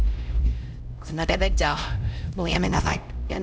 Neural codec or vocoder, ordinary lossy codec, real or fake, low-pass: codec, 16 kHz, 0.5 kbps, X-Codec, HuBERT features, trained on LibriSpeech; none; fake; none